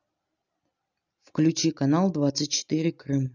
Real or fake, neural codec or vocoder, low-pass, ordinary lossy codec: real; none; 7.2 kHz; none